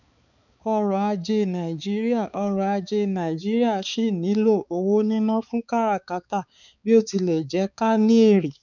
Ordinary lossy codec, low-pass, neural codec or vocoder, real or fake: none; 7.2 kHz; codec, 16 kHz, 4 kbps, X-Codec, HuBERT features, trained on balanced general audio; fake